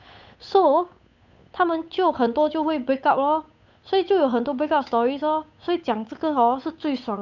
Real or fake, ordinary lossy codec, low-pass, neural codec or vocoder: fake; none; 7.2 kHz; codec, 16 kHz, 8 kbps, FunCodec, trained on Chinese and English, 25 frames a second